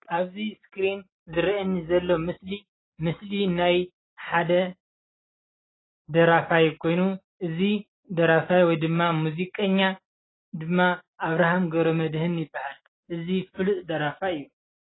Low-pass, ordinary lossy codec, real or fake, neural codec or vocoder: 7.2 kHz; AAC, 16 kbps; real; none